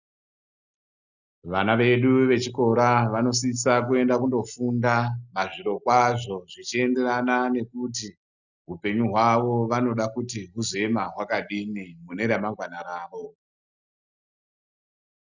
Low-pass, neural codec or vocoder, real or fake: 7.2 kHz; none; real